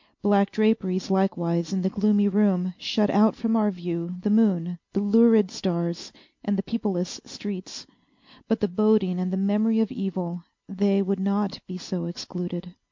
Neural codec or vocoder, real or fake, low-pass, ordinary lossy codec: none; real; 7.2 kHz; MP3, 48 kbps